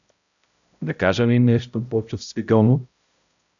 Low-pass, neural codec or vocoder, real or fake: 7.2 kHz; codec, 16 kHz, 0.5 kbps, X-Codec, HuBERT features, trained on balanced general audio; fake